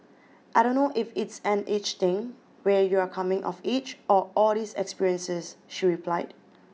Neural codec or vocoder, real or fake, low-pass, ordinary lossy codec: none; real; none; none